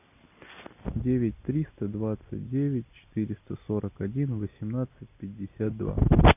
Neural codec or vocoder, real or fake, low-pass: none; real; 3.6 kHz